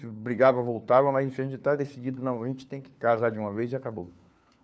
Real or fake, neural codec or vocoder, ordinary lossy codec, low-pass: fake; codec, 16 kHz, 4 kbps, FunCodec, trained on LibriTTS, 50 frames a second; none; none